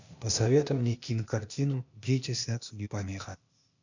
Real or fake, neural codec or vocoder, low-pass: fake; codec, 16 kHz, 0.8 kbps, ZipCodec; 7.2 kHz